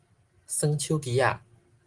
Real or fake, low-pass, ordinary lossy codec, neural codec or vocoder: real; 10.8 kHz; Opus, 24 kbps; none